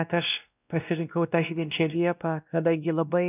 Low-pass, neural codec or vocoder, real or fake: 3.6 kHz; codec, 16 kHz, 1 kbps, X-Codec, WavLM features, trained on Multilingual LibriSpeech; fake